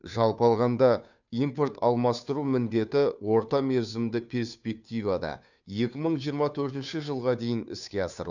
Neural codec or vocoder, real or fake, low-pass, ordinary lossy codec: codec, 16 kHz, 2 kbps, FunCodec, trained on Chinese and English, 25 frames a second; fake; 7.2 kHz; none